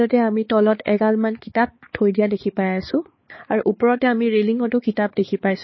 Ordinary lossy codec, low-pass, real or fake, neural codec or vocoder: MP3, 24 kbps; 7.2 kHz; fake; codec, 16 kHz, 4 kbps, X-Codec, HuBERT features, trained on balanced general audio